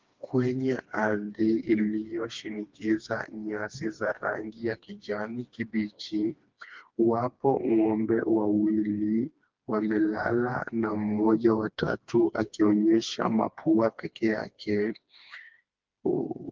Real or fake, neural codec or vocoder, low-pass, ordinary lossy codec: fake; codec, 16 kHz, 2 kbps, FreqCodec, smaller model; 7.2 kHz; Opus, 32 kbps